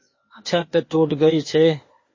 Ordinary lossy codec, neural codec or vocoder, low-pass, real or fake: MP3, 32 kbps; codec, 16 kHz, 0.8 kbps, ZipCodec; 7.2 kHz; fake